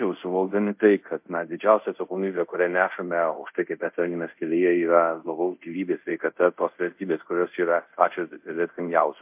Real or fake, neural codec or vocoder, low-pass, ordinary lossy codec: fake; codec, 24 kHz, 0.5 kbps, DualCodec; 3.6 kHz; MP3, 32 kbps